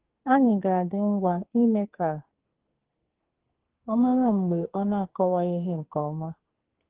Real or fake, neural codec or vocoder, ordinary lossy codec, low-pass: fake; codec, 44.1 kHz, 2.6 kbps, SNAC; Opus, 16 kbps; 3.6 kHz